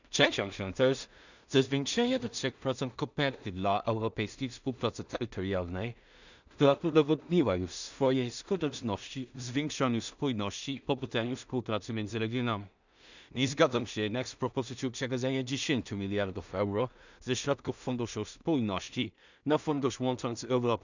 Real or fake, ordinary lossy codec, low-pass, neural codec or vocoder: fake; none; 7.2 kHz; codec, 16 kHz in and 24 kHz out, 0.4 kbps, LongCat-Audio-Codec, two codebook decoder